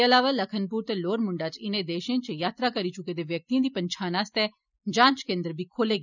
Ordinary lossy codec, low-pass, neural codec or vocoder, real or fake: none; 7.2 kHz; none; real